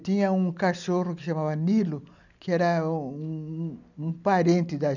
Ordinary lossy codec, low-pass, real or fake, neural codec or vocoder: none; 7.2 kHz; real; none